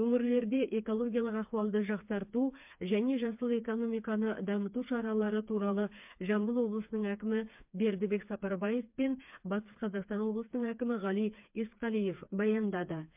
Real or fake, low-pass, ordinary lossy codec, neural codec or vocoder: fake; 3.6 kHz; MP3, 32 kbps; codec, 16 kHz, 4 kbps, FreqCodec, smaller model